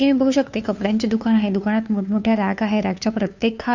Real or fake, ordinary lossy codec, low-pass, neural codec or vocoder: fake; none; 7.2 kHz; codec, 16 kHz, 2 kbps, FunCodec, trained on Chinese and English, 25 frames a second